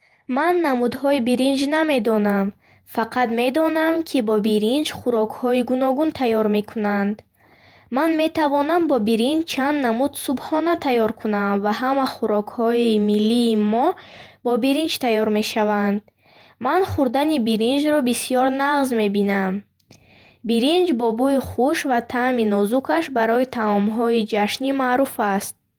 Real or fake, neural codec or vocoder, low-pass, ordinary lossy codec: fake; vocoder, 48 kHz, 128 mel bands, Vocos; 19.8 kHz; Opus, 32 kbps